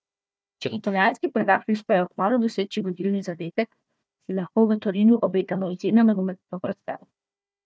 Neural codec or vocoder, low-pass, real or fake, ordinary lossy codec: codec, 16 kHz, 1 kbps, FunCodec, trained on Chinese and English, 50 frames a second; none; fake; none